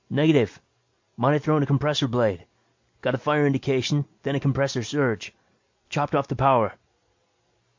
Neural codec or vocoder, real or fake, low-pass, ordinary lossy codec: none; real; 7.2 kHz; MP3, 48 kbps